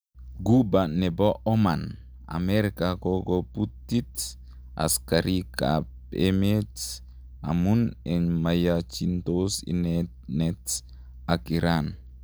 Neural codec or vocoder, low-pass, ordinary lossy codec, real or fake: none; none; none; real